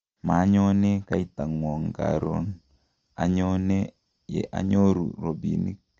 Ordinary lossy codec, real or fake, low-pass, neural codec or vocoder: Opus, 24 kbps; real; 7.2 kHz; none